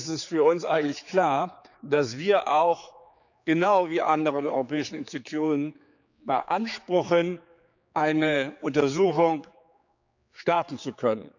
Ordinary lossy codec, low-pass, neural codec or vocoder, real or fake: none; 7.2 kHz; codec, 16 kHz, 4 kbps, X-Codec, HuBERT features, trained on general audio; fake